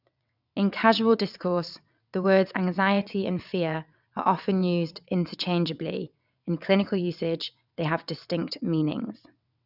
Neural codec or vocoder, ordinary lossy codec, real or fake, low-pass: vocoder, 22.05 kHz, 80 mel bands, WaveNeXt; none; fake; 5.4 kHz